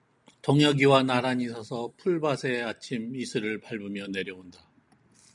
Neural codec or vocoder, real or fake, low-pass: none; real; 10.8 kHz